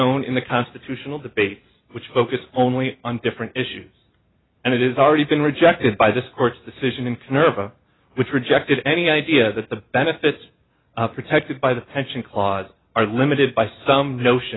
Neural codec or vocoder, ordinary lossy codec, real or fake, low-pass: none; AAC, 16 kbps; real; 7.2 kHz